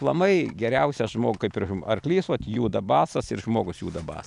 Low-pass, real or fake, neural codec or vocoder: 10.8 kHz; real; none